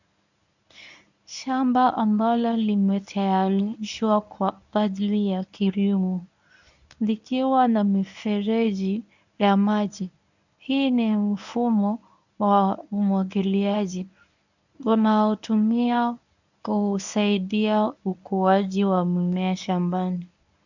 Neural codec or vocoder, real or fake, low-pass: codec, 24 kHz, 0.9 kbps, WavTokenizer, medium speech release version 1; fake; 7.2 kHz